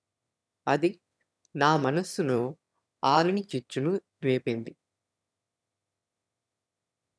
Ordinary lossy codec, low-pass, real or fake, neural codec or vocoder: none; none; fake; autoencoder, 22.05 kHz, a latent of 192 numbers a frame, VITS, trained on one speaker